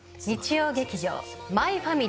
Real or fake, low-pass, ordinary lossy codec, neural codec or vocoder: real; none; none; none